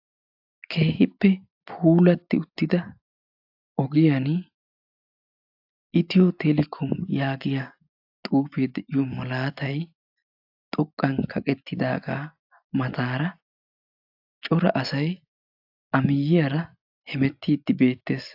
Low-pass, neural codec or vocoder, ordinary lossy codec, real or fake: 5.4 kHz; none; AAC, 48 kbps; real